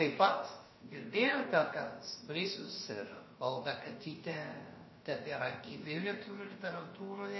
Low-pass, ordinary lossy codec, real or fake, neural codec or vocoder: 7.2 kHz; MP3, 24 kbps; fake; codec, 16 kHz, about 1 kbps, DyCAST, with the encoder's durations